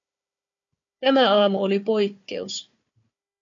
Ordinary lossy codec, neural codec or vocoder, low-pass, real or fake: MP3, 64 kbps; codec, 16 kHz, 4 kbps, FunCodec, trained on Chinese and English, 50 frames a second; 7.2 kHz; fake